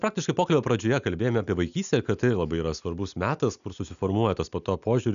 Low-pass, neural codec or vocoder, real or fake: 7.2 kHz; none; real